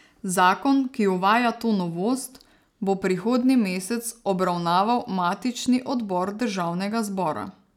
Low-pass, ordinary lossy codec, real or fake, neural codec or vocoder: 19.8 kHz; none; real; none